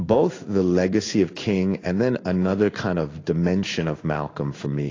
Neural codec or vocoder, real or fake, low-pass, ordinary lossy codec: codec, 16 kHz in and 24 kHz out, 1 kbps, XY-Tokenizer; fake; 7.2 kHz; AAC, 32 kbps